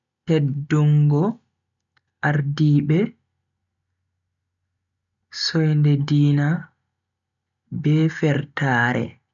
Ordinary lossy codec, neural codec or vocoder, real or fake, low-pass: none; none; real; 7.2 kHz